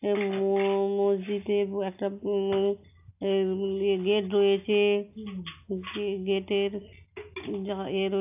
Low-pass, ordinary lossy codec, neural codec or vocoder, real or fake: 3.6 kHz; AAC, 32 kbps; none; real